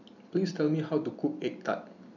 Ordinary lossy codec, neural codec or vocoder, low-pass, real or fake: none; vocoder, 44.1 kHz, 128 mel bands every 256 samples, BigVGAN v2; 7.2 kHz; fake